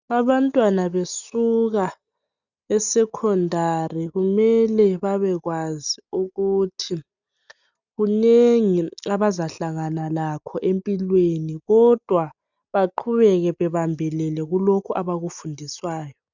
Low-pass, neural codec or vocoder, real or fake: 7.2 kHz; none; real